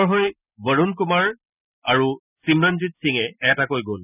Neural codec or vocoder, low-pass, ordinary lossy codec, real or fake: none; 3.6 kHz; none; real